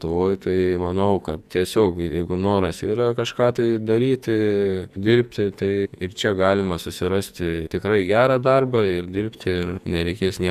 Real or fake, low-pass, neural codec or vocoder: fake; 14.4 kHz; codec, 44.1 kHz, 2.6 kbps, SNAC